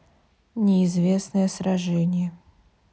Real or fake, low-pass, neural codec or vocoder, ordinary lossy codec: real; none; none; none